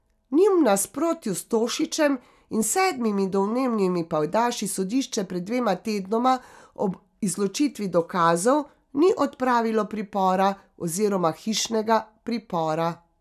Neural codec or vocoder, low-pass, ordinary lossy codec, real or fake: none; 14.4 kHz; none; real